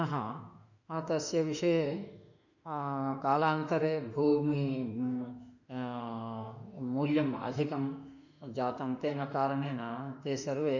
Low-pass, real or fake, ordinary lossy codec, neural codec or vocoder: 7.2 kHz; fake; none; autoencoder, 48 kHz, 32 numbers a frame, DAC-VAE, trained on Japanese speech